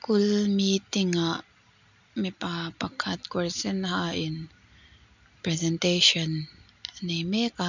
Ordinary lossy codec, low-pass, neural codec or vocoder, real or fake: none; 7.2 kHz; none; real